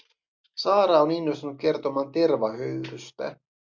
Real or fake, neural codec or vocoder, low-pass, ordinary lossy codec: real; none; 7.2 kHz; MP3, 64 kbps